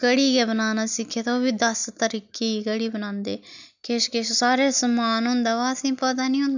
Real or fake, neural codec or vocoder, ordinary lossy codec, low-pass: real; none; none; 7.2 kHz